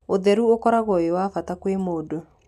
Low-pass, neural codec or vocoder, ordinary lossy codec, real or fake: 14.4 kHz; none; none; real